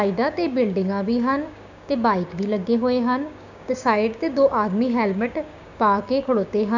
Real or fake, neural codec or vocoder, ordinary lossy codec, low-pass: fake; codec, 16 kHz, 6 kbps, DAC; none; 7.2 kHz